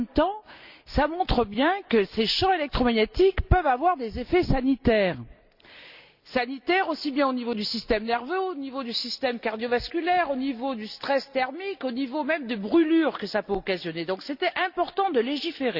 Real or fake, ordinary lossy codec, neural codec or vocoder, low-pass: real; Opus, 64 kbps; none; 5.4 kHz